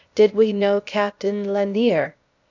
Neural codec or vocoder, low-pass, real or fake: codec, 16 kHz, 0.8 kbps, ZipCodec; 7.2 kHz; fake